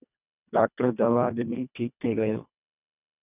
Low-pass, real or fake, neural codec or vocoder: 3.6 kHz; fake; codec, 24 kHz, 1.5 kbps, HILCodec